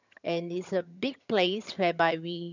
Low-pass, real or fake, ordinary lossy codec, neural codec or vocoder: 7.2 kHz; fake; none; vocoder, 22.05 kHz, 80 mel bands, HiFi-GAN